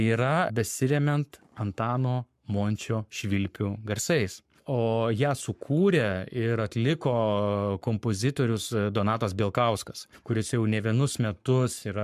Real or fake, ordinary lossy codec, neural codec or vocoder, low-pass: fake; MP3, 96 kbps; codec, 44.1 kHz, 7.8 kbps, Pupu-Codec; 14.4 kHz